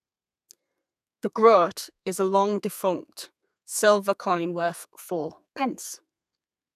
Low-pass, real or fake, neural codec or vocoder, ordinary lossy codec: 14.4 kHz; fake; codec, 32 kHz, 1.9 kbps, SNAC; none